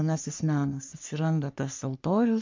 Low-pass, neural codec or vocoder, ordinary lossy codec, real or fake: 7.2 kHz; codec, 44.1 kHz, 3.4 kbps, Pupu-Codec; MP3, 64 kbps; fake